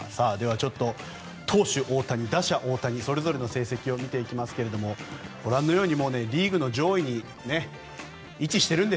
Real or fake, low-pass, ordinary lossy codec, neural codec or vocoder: real; none; none; none